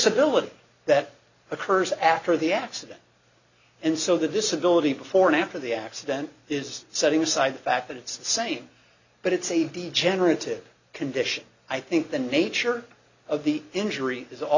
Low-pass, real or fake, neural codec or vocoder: 7.2 kHz; real; none